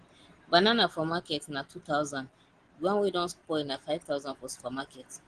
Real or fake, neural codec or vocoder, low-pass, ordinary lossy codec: real; none; 9.9 kHz; Opus, 16 kbps